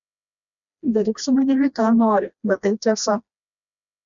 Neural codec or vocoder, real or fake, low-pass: codec, 16 kHz, 1 kbps, FreqCodec, smaller model; fake; 7.2 kHz